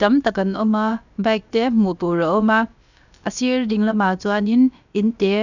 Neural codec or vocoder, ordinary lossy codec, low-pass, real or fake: codec, 16 kHz, about 1 kbps, DyCAST, with the encoder's durations; none; 7.2 kHz; fake